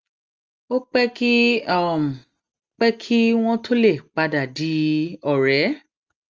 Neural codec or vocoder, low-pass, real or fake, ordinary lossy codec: none; 7.2 kHz; real; Opus, 24 kbps